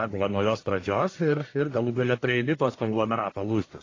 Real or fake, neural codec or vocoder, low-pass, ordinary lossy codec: fake; codec, 44.1 kHz, 1.7 kbps, Pupu-Codec; 7.2 kHz; AAC, 32 kbps